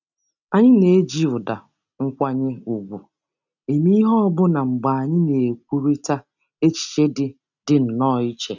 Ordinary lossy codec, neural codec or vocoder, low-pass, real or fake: none; none; 7.2 kHz; real